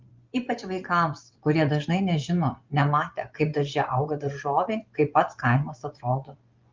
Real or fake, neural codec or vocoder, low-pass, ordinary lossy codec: fake; vocoder, 24 kHz, 100 mel bands, Vocos; 7.2 kHz; Opus, 32 kbps